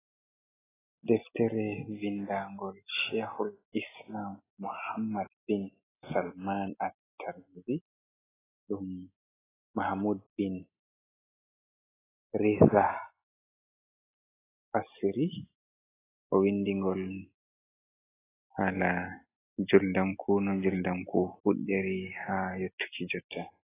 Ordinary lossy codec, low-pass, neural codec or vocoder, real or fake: AAC, 16 kbps; 3.6 kHz; none; real